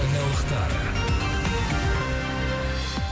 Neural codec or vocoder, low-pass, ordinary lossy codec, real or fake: none; none; none; real